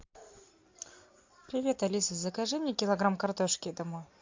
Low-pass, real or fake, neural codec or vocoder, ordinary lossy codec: 7.2 kHz; real; none; MP3, 64 kbps